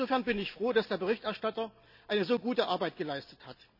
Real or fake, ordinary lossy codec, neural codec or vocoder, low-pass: real; none; none; 5.4 kHz